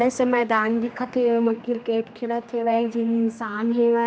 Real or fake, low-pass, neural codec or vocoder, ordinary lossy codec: fake; none; codec, 16 kHz, 2 kbps, X-Codec, HuBERT features, trained on general audio; none